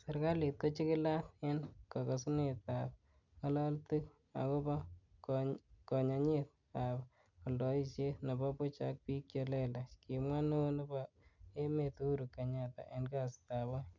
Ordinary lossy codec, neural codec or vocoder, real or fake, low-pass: none; none; real; 7.2 kHz